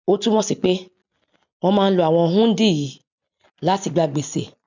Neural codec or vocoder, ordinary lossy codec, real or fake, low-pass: none; none; real; 7.2 kHz